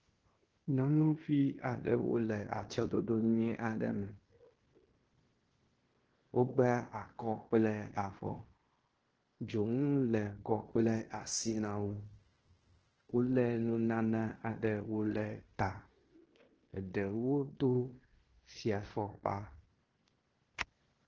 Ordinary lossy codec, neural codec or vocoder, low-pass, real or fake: Opus, 16 kbps; codec, 16 kHz in and 24 kHz out, 0.9 kbps, LongCat-Audio-Codec, fine tuned four codebook decoder; 7.2 kHz; fake